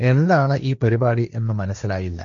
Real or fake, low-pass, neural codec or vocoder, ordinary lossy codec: fake; 7.2 kHz; codec, 16 kHz, 1.1 kbps, Voila-Tokenizer; none